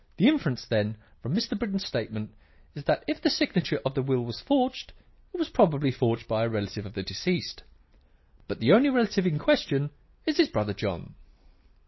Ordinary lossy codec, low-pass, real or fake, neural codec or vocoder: MP3, 24 kbps; 7.2 kHz; real; none